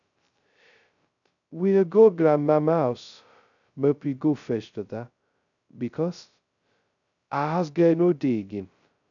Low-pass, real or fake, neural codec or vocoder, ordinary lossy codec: 7.2 kHz; fake; codec, 16 kHz, 0.2 kbps, FocalCodec; none